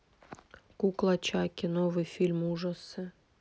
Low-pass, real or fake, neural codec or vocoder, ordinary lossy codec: none; real; none; none